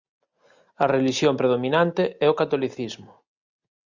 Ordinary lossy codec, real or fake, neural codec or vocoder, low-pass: Opus, 64 kbps; real; none; 7.2 kHz